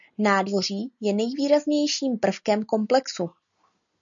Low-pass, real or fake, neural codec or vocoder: 7.2 kHz; real; none